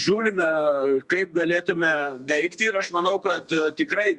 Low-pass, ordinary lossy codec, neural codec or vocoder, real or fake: 10.8 kHz; Opus, 64 kbps; codec, 32 kHz, 1.9 kbps, SNAC; fake